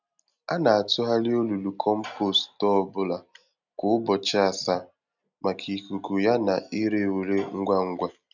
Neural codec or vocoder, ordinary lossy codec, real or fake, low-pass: none; none; real; 7.2 kHz